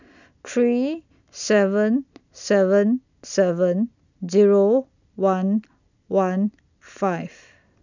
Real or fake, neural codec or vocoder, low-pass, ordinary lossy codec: real; none; 7.2 kHz; none